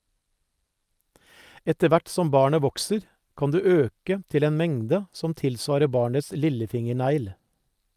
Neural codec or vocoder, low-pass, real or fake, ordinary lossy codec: none; 14.4 kHz; real; Opus, 32 kbps